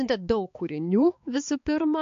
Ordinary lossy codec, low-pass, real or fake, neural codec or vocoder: MP3, 48 kbps; 7.2 kHz; fake; codec, 16 kHz, 4 kbps, X-Codec, HuBERT features, trained on balanced general audio